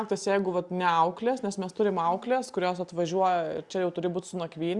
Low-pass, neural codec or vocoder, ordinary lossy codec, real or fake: 10.8 kHz; none; Opus, 64 kbps; real